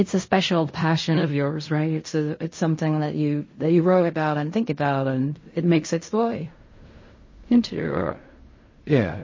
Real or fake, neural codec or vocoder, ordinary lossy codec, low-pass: fake; codec, 16 kHz in and 24 kHz out, 0.4 kbps, LongCat-Audio-Codec, fine tuned four codebook decoder; MP3, 32 kbps; 7.2 kHz